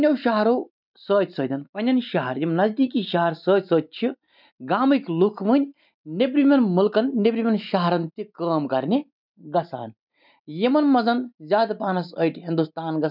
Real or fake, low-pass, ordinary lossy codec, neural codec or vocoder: real; 5.4 kHz; none; none